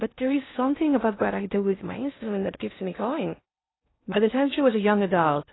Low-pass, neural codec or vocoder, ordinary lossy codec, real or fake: 7.2 kHz; codec, 16 kHz in and 24 kHz out, 0.6 kbps, FocalCodec, streaming, 2048 codes; AAC, 16 kbps; fake